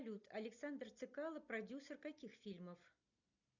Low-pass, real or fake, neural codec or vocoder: 7.2 kHz; real; none